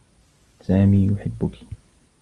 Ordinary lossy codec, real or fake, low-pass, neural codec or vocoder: Opus, 32 kbps; real; 10.8 kHz; none